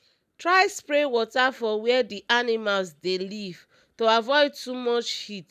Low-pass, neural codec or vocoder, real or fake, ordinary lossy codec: 14.4 kHz; none; real; none